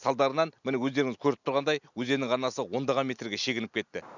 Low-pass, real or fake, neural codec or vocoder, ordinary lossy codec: 7.2 kHz; real; none; none